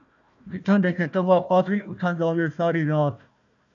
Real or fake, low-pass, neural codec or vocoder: fake; 7.2 kHz; codec, 16 kHz, 1 kbps, FunCodec, trained on Chinese and English, 50 frames a second